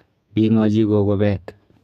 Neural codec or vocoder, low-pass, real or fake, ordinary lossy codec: codec, 32 kHz, 1.9 kbps, SNAC; 14.4 kHz; fake; none